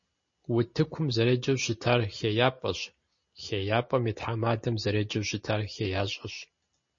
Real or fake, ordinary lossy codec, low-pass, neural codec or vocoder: real; MP3, 32 kbps; 7.2 kHz; none